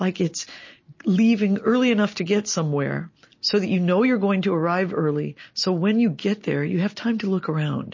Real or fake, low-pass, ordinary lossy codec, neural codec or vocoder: real; 7.2 kHz; MP3, 32 kbps; none